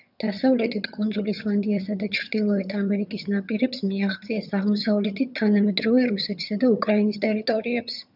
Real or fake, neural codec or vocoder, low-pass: fake; vocoder, 22.05 kHz, 80 mel bands, HiFi-GAN; 5.4 kHz